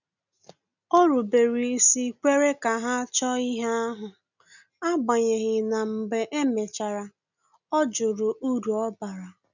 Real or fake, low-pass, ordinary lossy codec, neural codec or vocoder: real; 7.2 kHz; none; none